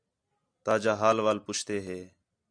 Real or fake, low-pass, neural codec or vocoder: real; 9.9 kHz; none